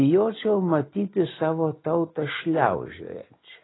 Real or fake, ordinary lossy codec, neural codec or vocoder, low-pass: real; AAC, 16 kbps; none; 7.2 kHz